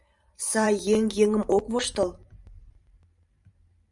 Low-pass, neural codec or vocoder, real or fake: 10.8 kHz; vocoder, 44.1 kHz, 128 mel bands every 512 samples, BigVGAN v2; fake